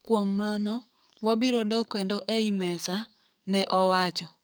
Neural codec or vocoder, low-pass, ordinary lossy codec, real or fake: codec, 44.1 kHz, 2.6 kbps, SNAC; none; none; fake